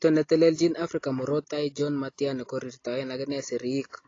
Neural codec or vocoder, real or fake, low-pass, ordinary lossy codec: none; real; 7.2 kHz; AAC, 32 kbps